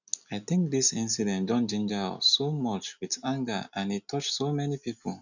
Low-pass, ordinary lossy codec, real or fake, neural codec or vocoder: 7.2 kHz; none; real; none